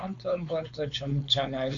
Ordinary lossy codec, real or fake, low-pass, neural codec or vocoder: AAC, 48 kbps; fake; 7.2 kHz; codec, 16 kHz, 4.8 kbps, FACodec